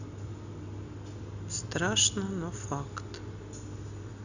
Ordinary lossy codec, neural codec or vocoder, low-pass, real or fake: none; none; 7.2 kHz; real